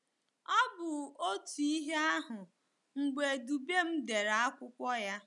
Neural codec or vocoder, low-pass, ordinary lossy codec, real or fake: none; 10.8 kHz; none; real